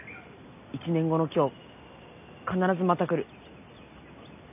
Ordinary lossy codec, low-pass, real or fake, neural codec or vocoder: none; 3.6 kHz; real; none